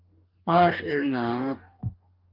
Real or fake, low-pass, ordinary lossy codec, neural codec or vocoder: fake; 5.4 kHz; Opus, 32 kbps; codec, 44.1 kHz, 2.6 kbps, DAC